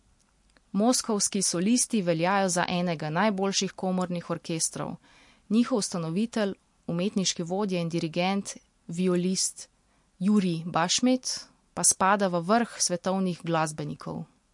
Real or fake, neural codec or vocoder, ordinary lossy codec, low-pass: real; none; MP3, 48 kbps; 10.8 kHz